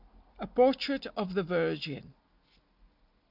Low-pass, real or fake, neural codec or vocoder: 5.4 kHz; real; none